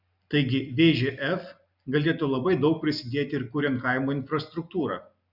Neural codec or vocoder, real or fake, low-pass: none; real; 5.4 kHz